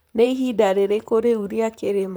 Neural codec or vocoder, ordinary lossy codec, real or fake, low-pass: vocoder, 44.1 kHz, 128 mel bands, Pupu-Vocoder; none; fake; none